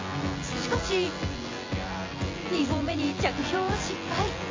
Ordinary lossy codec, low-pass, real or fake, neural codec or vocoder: AAC, 32 kbps; 7.2 kHz; fake; vocoder, 24 kHz, 100 mel bands, Vocos